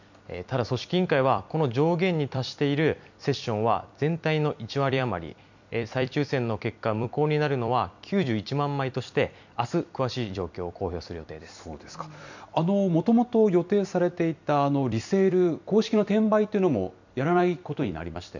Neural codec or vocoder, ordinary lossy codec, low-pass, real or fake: vocoder, 44.1 kHz, 128 mel bands every 256 samples, BigVGAN v2; none; 7.2 kHz; fake